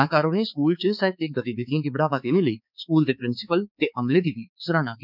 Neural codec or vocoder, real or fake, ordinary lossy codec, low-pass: codec, 16 kHz, 4 kbps, X-Codec, HuBERT features, trained on balanced general audio; fake; none; 5.4 kHz